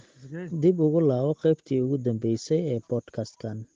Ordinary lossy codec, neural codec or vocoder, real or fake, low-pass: Opus, 16 kbps; none; real; 7.2 kHz